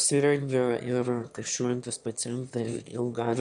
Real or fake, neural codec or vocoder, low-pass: fake; autoencoder, 22.05 kHz, a latent of 192 numbers a frame, VITS, trained on one speaker; 9.9 kHz